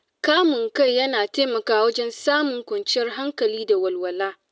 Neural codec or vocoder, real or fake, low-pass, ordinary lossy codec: none; real; none; none